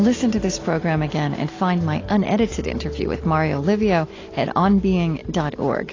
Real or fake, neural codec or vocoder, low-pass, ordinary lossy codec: real; none; 7.2 kHz; AAC, 32 kbps